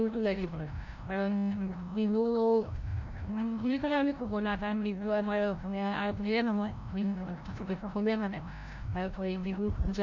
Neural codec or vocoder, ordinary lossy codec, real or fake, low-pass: codec, 16 kHz, 0.5 kbps, FreqCodec, larger model; MP3, 64 kbps; fake; 7.2 kHz